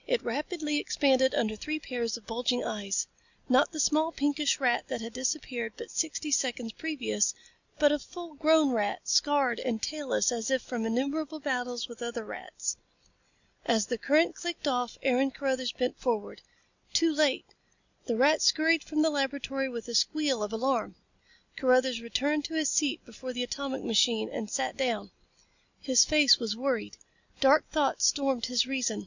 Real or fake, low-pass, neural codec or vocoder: real; 7.2 kHz; none